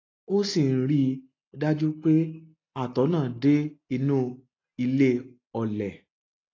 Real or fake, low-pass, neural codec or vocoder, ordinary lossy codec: real; 7.2 kHz; none; AAC, 32 kbps